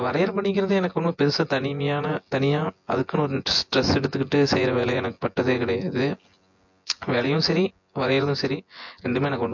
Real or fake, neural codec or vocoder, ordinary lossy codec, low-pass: fake; vocoder, 24 kHz, 100 mel bands, Vocos; MP3, 48 kbps; 7.2 kHz